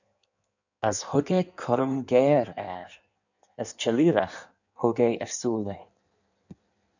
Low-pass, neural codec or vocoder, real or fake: 7.2 kHz; codec, 16 kHz in and 24 kHz out, 1.1 kbps, FireRedTTS-2 codec; fake